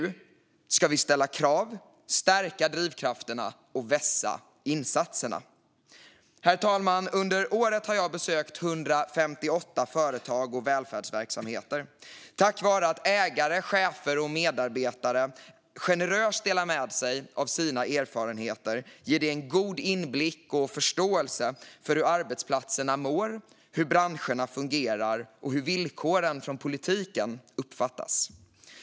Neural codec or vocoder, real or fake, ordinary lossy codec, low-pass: none; real; none; none